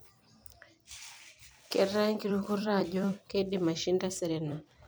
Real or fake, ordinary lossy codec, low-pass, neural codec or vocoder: fake; none; none; vocoder, 44.1 kHz, 128 mel bands every 256 samples, BigVGAN v2